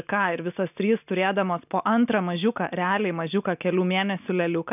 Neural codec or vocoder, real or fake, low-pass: none; real; 3.6 kHz